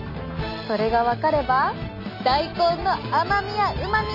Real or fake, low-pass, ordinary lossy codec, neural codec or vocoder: real; 5.4 kHz; none; none